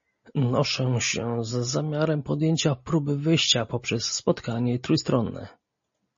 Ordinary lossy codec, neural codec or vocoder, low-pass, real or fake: MP3, 32 kbps; none; 7.2 kHz; real